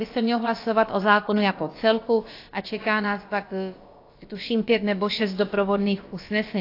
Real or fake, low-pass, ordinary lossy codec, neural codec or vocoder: fake; 5.4 kHz; AAC, 32 kbps; codec, 16 kHz, about 1 kbps, DyCAST, with the encoder's durations